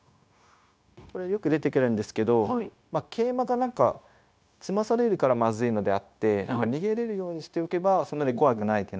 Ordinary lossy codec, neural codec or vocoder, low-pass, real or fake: none; codec, 16 kHz, 0.9 kbps, LongCat-Audio-Codec; none; fake